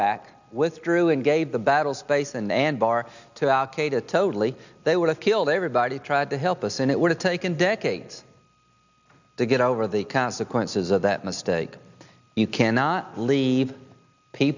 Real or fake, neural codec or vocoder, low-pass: real; none; 7.2 kHz